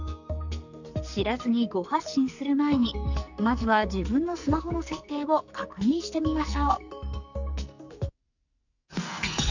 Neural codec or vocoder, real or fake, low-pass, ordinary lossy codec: codec, 44.1 kHz, 2.6 kbps, SNAC; fake; 7.2 kHz; none